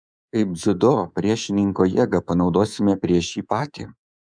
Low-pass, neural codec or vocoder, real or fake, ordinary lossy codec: 9.9 kHz; codec, 24 kHz, 3.1 kbps, DualCodec; fake; MP3, 96 kbps